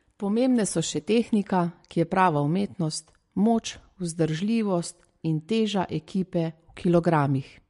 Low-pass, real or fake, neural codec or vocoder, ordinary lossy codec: 10.8 kHz; real; none; MP3, 48 kbps